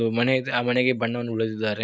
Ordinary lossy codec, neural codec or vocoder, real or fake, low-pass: none; none; real; none